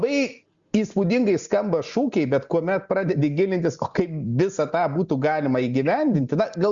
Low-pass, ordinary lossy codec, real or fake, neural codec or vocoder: 7.2 kHz; Opus, 64 kbps; real; none